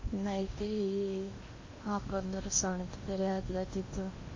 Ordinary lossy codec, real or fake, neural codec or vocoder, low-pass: MP3, 32 kbps; fake; codec, 16 kHz in and 24 kHz out, 0.8 kbps, FocalCodec, streaming, 65536 codes; 7.2 kHz